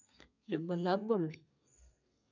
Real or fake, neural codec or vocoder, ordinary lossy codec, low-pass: fake; codec, 32 kHz, 1.9 kbps, SNAC; none; 7.2 kHz